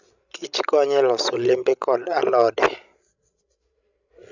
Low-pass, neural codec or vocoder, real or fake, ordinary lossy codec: 7.2 kHz; codec, 16 kHz, 16 kbps, FreqCodec, larger model; fake; none